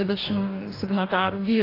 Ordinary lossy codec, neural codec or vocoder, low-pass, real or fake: AAC, 24 kbps; codec, 44.1 kHz, 2.6 kbps, DAC; 5.4 kHz; fake